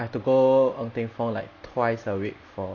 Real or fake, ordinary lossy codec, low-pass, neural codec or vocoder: real; none; 7.2 kHz; none